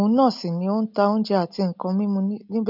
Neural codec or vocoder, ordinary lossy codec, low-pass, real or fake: none; none; 5.4 kHz; real